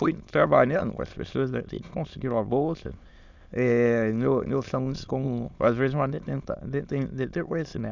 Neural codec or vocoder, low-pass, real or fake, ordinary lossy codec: autoencoder, 22.05 kHz, a latent of 192 numbers a frame, VITS, trained on many speakers; 7.2 kHz; fake; none